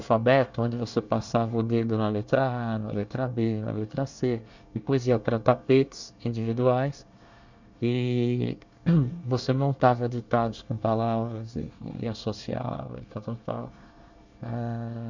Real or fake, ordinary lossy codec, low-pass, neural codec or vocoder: fake; none; 7.2 kHz; codec, 24 kHz, 1 kbps, SNAC